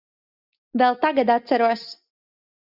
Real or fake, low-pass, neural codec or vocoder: real; 5.4 kHz; none